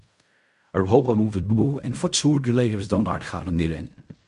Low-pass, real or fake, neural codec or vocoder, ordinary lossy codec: 10.8 kHz; fake; codec, 16 kHz in and 24 kHz out, 0.4 kbps, LongCat-Audio-Codec, fine tuned four codebook decoder; MP3, 64 kbps